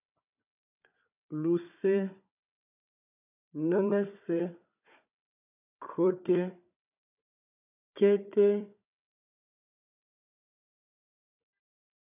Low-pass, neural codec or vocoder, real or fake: 3.6 kHz; codec, 16 kHz, 4 kbps, FunCodec, trained on Chinese and English, 50 frames a second; fake